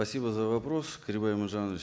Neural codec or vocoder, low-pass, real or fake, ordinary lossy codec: none; none; real; none